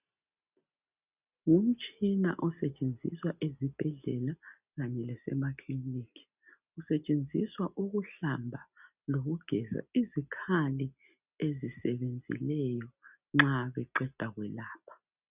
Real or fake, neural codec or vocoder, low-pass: real; none; 3.6 kHz